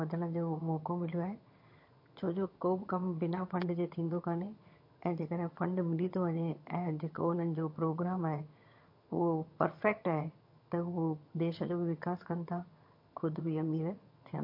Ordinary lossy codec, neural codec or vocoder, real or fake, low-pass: MP3, 32 kbps; vocoder, 22.05 kHz, 80 mel bands, HiFi-GAN; fake; 5.4 kHz